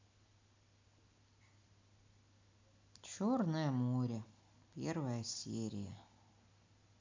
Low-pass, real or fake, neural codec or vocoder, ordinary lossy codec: 7.2 kHz; real; none; MP3, 64 kbps